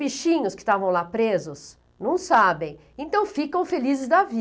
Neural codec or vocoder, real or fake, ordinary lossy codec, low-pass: none; real; none; none